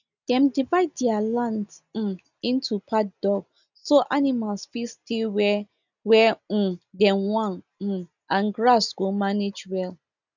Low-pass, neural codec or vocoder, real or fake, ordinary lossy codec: 7.2 kHz; none; real; none